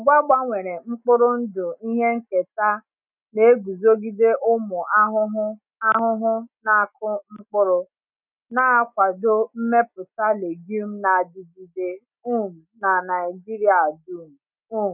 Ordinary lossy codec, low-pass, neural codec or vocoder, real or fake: none; 3.6 kHz; none; real